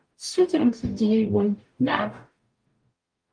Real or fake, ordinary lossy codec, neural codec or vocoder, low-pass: fake; Opus, 32 kbps; codec, 44.1 kHz, 0.9 kbps, DAC; 9.9 kHz